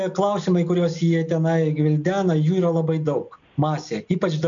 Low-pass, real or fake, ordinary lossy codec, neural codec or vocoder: 7.2 kHz; real; MP3, 64 kbps; none